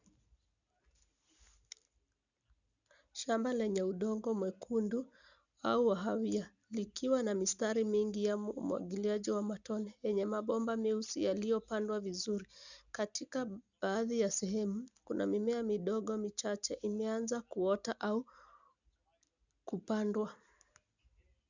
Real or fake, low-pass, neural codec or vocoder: real; 7.2 kHz; none